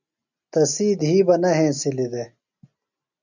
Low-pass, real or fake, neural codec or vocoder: 7.2 kHz; real; none